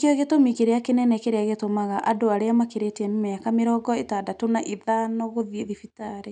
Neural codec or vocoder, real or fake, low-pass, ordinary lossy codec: none; real; 9.9 kHz; none